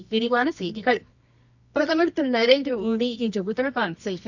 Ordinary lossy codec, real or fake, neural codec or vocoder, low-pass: none; fake; codec, 24 kHz, 0.9 kbps, WavTokenizer, medium music audio release; 7.2 kHz